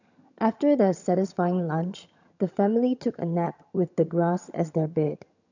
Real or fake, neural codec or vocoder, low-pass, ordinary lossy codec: fake; vocoder, 22.05 kHz, 80 mel bands, HiFi-GAN; 7.2 kHz; none